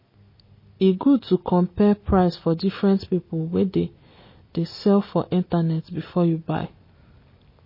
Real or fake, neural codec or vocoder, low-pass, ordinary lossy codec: real; none; 5.4 kHz; MP3, 24 kbps